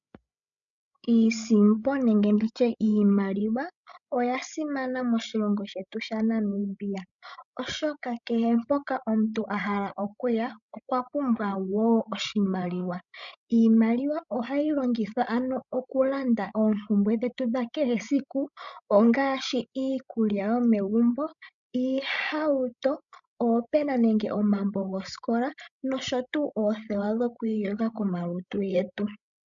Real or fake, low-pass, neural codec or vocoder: fake; 7.2 kHz; codec, 16 kHz, 16 kbps, FreqCodec, larger model